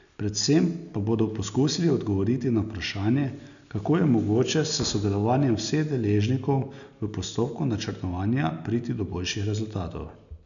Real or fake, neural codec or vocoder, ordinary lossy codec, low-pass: real; none; none; 7.2 kHz